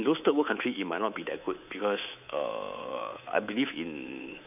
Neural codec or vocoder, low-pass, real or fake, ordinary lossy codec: codec, 24 kHz, 3.1 kbps, DualCodec; 3.6 kHz; fake; none